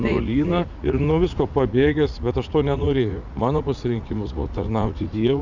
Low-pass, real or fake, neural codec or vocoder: 7.2 kHz; fake; vocoder, 44.1 kHz, 80 mel bands, Vocos